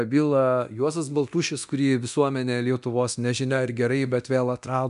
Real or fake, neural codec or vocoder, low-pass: fake; codec, 24 kHz, 0.9 kbps, DualCodec; 10.8 kHz